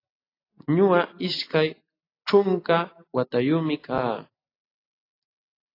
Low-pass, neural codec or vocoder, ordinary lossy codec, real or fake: 5.4 kHz; none; AAC, 24 kbps; real